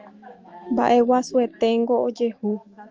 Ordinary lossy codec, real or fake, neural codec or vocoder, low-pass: Opus, 24 kbps; fake; autoencoder, 48 kHz, 128 numbers a frame, DAC-VAE, trained on Japanese speech; 7.2 kHz